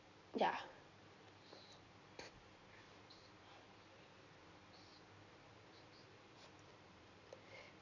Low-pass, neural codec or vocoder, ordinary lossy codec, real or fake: 7.2 kHz; none; none; real